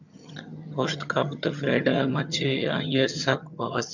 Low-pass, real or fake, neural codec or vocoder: 7.2 kHz; fake; vocoder, 22.05 kHz, 80 mel bands, HiFi-GAN